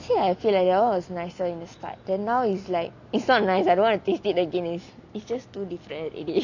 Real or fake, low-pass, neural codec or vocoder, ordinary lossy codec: real; 7.2 kHz; none; none